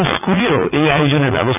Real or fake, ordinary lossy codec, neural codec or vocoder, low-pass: fake; none; vocoder, 44.1 kHz, 80 mel bands, Vocos; 3.6 kHz